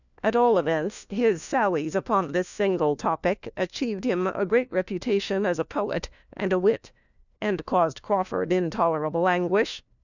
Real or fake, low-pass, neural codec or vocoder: fake; 7.2 kHz; codec, 16 kHz, 1 kbps, FunCodec, trained on LibriTTS, 50 frames a second